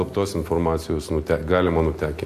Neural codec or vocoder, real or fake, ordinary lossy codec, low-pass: none; real; AAC, 64 kbps; 14.4 kHz